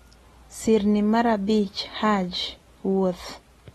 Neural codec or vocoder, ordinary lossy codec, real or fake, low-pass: none; AAC, 32 kbps; real; 19.8 kHz